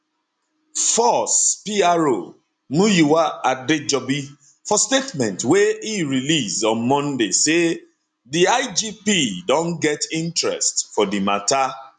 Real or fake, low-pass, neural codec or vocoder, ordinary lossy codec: real; 9.9 kHz; none; none